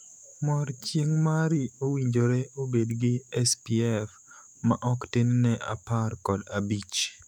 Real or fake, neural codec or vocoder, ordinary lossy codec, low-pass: fake; autoencoder, 48 kHz, 128 numbers a frame, DAC-VAE, trained on Japanese speech; none; 19.8 kHz